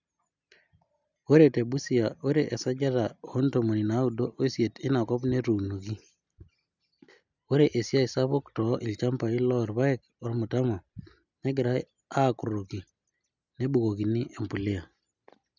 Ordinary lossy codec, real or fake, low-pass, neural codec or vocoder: none; real; 7.2 kHz; none